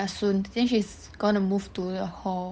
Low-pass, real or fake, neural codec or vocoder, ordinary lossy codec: none; fake; codec, 16 kHz, 8 kbps, FunCodec, trained on Chinese and English, 25 frames a second; none